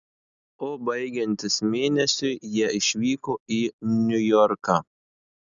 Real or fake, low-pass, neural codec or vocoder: real; 7.2 kHz; none